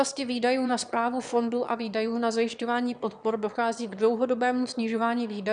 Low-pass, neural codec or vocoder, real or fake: 9.9 kHz; autoencoder, 22.05 kHz, a latent of 192 numbers a frame, VITS, trained on one speaker; fake